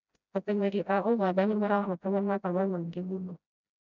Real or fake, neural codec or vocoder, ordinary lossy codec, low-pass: fake; codec, 16 kHz, 0.5 kbps, FreqCodec, smaller model; none; 7.2 kHz